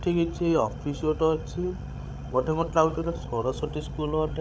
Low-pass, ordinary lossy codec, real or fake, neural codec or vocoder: none; none; fake; codec, 16 kHz, 16 kbps, FreqCodec, larger model